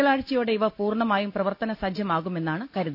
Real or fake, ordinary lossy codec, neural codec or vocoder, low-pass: real; none; none; 5.4 kHz